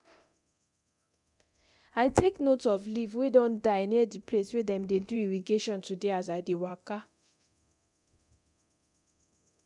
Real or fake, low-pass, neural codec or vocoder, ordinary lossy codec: fake; 10.8 kHz; codec, 24 kHz, 0.9 kbps, DualCodec; MP3, 96 kbps